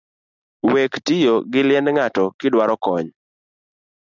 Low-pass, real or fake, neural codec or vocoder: 7.2 kHz; real; none